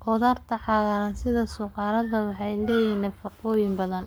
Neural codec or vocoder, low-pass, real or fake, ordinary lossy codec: codec, 44.1 kHz, 7.8 kbps, Pupu-Codec; none; fake; none